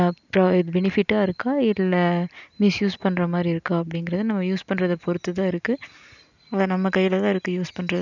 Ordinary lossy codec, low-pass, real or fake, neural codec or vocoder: none; 7.2 kHz; real; none